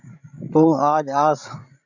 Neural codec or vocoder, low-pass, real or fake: codec, 16 kHz, 16 kbps, FreqCodec, larger model; 7.2 kHz; fake